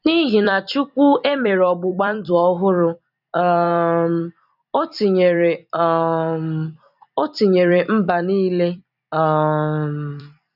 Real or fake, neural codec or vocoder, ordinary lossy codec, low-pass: fake; vocoder, 44.1 kHz, 128 mel bands every 256 samples, BigVGAN v2; none; 5.4 kHz